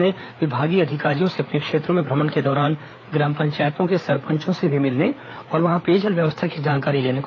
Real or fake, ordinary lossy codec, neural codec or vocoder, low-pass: fake; AAC, 32 kbps; codec, 16 kHz, 4 kbps, FreqCodec, larger model; 7.2 kHz